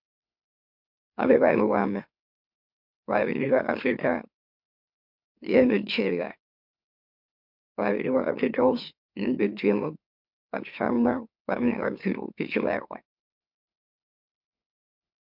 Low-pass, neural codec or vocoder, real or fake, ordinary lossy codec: 5.4 kHz; autoencoder, 44.1 kHz, a latent of 192 numbers a frame, MeloTTS; fake; MP3, 48 kbps